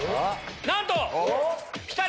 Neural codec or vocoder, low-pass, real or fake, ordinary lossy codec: none; none; real; none